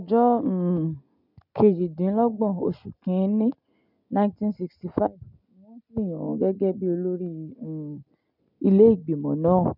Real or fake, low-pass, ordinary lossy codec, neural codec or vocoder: real; 5.4 kHz; none; none